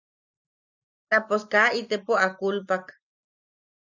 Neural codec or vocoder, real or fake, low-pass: none; real; 7.2 kHz